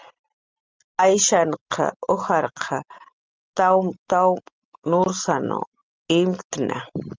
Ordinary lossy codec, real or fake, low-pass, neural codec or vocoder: Opus, 32 kbps; real; 7.2 kHz; none